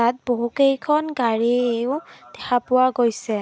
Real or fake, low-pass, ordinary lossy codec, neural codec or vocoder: real; none; none; none